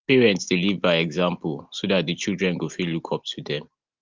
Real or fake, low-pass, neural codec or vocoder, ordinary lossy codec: real; 7.2 kHz; none; Opus, 32 kbps